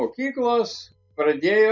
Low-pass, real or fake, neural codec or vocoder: 7.2 kHz; real; none